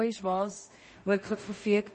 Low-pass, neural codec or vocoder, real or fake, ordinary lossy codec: 10.8 kHz; codec, 16 kHz in and 24 kHz out, 0.4 kbps, LongCat-Audio-Codec, two codebook decoder; fake; MP3, 32 kbps